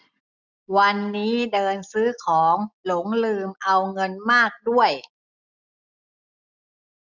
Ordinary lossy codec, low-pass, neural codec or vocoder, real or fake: none; 7.2 kHz; none; real